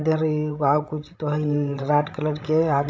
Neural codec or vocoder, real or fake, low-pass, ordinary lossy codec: codec, 16 kHz, 16 kbps, FreqCodec, larger model; fake; none; none